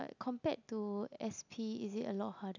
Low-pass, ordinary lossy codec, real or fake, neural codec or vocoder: 7.2 kHz; none; real; none